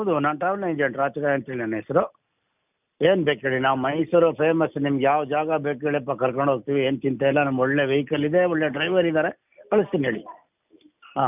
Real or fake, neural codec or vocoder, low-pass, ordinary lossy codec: real; none; 3.6 kHz; none